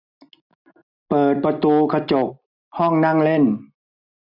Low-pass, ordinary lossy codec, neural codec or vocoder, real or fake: 5.4 kHz; none; none; real